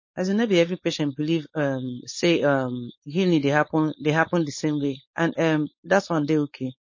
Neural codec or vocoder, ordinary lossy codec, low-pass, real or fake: codec, 16 kHz, 4.8 kbps, FACodec; MP3, 32 kbps; 7.2 kHz; fake